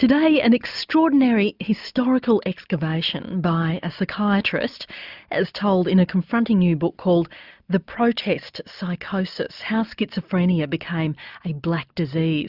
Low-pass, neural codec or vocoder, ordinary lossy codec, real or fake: 5.4 kHz; none; Opus, 64 kbps; real